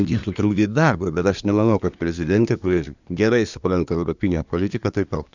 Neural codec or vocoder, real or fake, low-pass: codec, 24 kHz, 1 kbps, SNAC; fake; 7.2 kHz